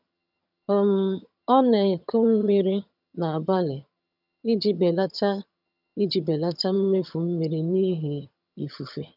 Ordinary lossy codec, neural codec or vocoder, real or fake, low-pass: none; vocoder, 22.05 kHz, 80 mel bands, HiFi-GAN; fake; 5.4 kHz